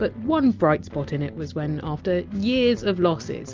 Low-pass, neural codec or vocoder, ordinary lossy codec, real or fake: 7.2 kHz; none; Opus, 24 kbps; real